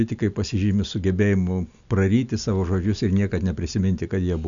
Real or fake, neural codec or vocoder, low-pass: real; none; 7.2 kHz